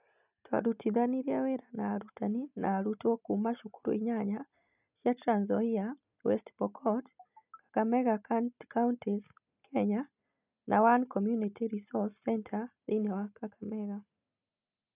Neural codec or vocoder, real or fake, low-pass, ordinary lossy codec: none; real; 3.6 kHz; none